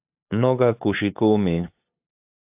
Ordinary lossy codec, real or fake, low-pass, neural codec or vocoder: AAC, 32 kbps; fake; 3.6 kHz; codec, 16 kHz, 8 kbps, FunCodec, trained on LibriTTS, 25 frames a second